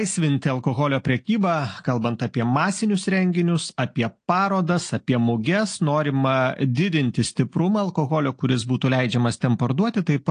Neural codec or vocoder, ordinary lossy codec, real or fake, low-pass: none; AAC, 48 kbps; real; 9.9 kHz